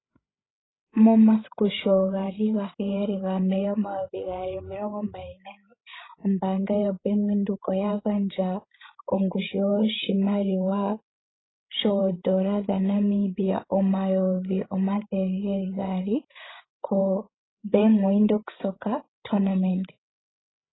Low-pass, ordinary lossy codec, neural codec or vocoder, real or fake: 7.2 kHz; AAC, 16 kbps; codec, 16 kHz, 16 kbps, FreqCodec, larger model; fake